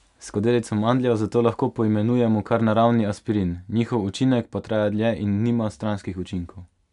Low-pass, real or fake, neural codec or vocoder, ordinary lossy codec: 10.8 kHz; real; none; none